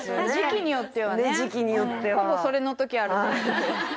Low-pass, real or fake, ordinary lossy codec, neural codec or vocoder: none; real; none; none